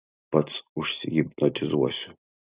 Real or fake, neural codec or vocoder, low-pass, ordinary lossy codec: real; none; 3.6 kHz; Opus, 64 kbps